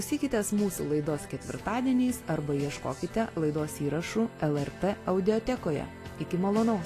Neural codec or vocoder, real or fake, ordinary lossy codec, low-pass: none; real; AAC, 48 kbps; 14.4 kHz